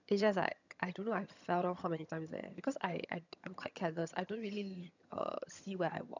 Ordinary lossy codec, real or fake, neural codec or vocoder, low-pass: none; fake; vocoder, 22.05 kHz, 80 mel bands, HiFi-GAN; 7.2 kHz